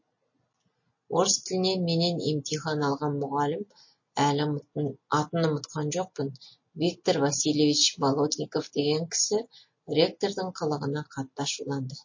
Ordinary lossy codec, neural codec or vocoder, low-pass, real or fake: MP3, 32 kbps; none; 7.2 kHz; real